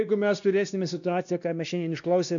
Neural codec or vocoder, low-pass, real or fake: codec, 16 kHz, 1 kbps, X-Codec, WavLM features, trained on Multilingual LibriSpeech; 7.2 kHz; fake